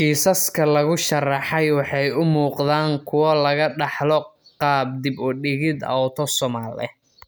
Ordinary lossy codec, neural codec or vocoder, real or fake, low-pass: none; none; real; none